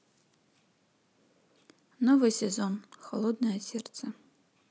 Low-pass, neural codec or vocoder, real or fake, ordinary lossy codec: none; none; real; none